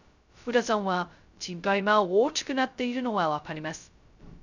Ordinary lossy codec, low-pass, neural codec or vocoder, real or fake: none; 7.2 kHz; codec, 16 kHz, 0.2 kbps, FocalCodec; fake